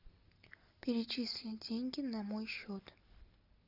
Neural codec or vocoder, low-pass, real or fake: none; 5.4 kHz; real